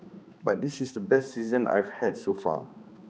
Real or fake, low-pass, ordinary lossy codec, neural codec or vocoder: fake; none; none; codec, 16 kHz, 2 kbps, X-Codec, HuBERT features, trained on balanced general audio